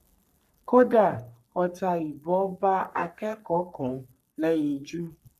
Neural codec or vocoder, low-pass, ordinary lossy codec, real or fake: codec, 44.1 kHz, 3.4 kbps, Pupu-Codec; 14.4 kHz; none; fake